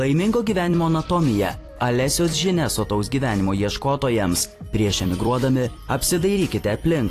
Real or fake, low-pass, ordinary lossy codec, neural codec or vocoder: fake; 14.4 kHz; AAC, 48 kbps; vocoder, 44.1 kHz, 128 mel bands every 256 samples, BigVGAN v2